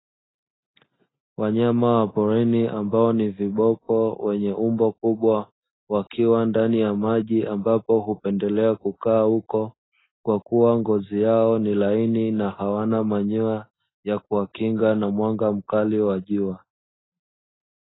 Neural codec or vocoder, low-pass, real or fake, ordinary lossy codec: none; 7.2 kHz; real; AAC, 16 kbps